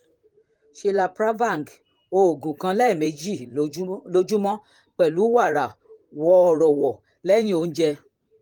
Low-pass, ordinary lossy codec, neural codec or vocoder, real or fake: 19.8 kHz; Opus, 32 kbps; vocoder, 44.1 kHz, 128 mel bands, Pupu-Vocoder; fake